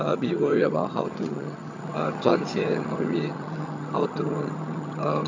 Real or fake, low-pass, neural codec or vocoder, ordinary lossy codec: fake; 7.2 kHz; vocoder, 22.05 kHz, 80 mel bands, HiFi-GAN; MP3, 64 kbps